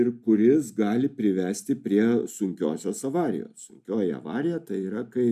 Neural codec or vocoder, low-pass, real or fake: none; 14.4 kHz; real